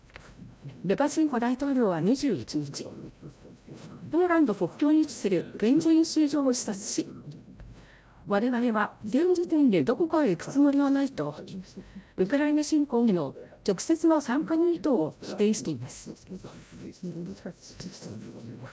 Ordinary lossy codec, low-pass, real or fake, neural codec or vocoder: none; none; fake; codec, 16 kHz, 0.5 kbps, FreqCodec, larger model